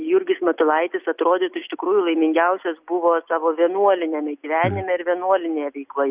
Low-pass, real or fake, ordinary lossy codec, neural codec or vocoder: 3.6 kHz; real; Opus, 64 kbps; none